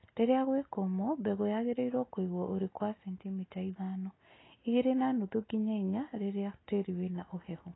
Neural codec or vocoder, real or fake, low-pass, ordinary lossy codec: none; real; 7.2 kHz; AAC, 16 kbps